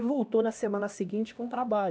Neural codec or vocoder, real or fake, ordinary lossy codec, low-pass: codec, 16 kHz, 1 kbps, X-Codec, HuBERT features, trained on LibriSpeech; fake; none; none